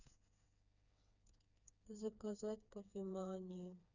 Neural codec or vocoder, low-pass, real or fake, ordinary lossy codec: codec, 16 kHz, 4 kbps, FreqCodec, smaller model; 7.2 kHz; fake; none